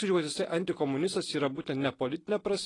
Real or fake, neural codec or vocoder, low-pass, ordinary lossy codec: real; none; 10.8 kHz; AAC, 32 kbps